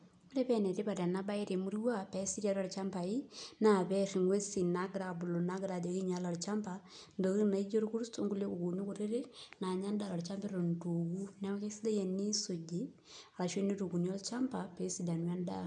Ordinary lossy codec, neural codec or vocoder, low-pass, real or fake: none; none; 9.9 kHz; real